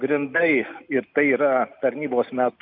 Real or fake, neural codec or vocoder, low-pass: real; none; 5.4 kHz